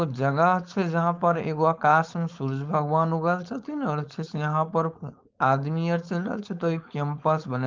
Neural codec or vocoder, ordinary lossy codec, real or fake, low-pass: codec, 16 kHz, 4.8 kbps, FACodec; Opus, 24 kbps; fake; 7.2 kHz